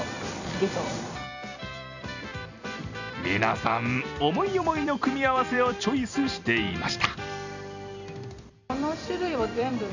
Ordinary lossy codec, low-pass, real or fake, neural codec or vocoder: none; 7.2 kHz; fake; vocoder, 44.1 kHz, 128 mel bands every 256 samples, BigVGAN v2